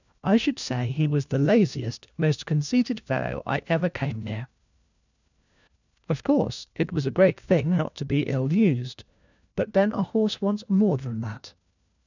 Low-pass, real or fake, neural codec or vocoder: 7.2 kHz; fake; codec, 16 kHz, 1 kbps, FunCodec, trained on LibriTTS, 50 frames a second